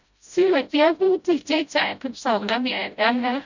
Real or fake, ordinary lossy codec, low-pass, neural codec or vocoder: fake; none; 7.2 kHz; codec, 16 kHz, 0.5 kbps, FreqCodec, smaller model